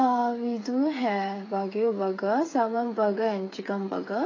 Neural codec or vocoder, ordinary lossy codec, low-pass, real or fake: codec, 16 kHz, 8 kbps, FreqCodec, smaller model; AAC, 32 kbps; 7.2 kHz; fake